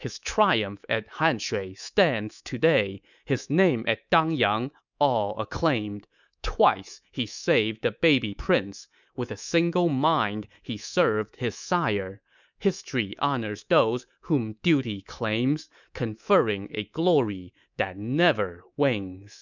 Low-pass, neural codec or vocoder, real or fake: 7.2 kHz; codec, 24 kHz, 3.1 kbps, DualCodec; fake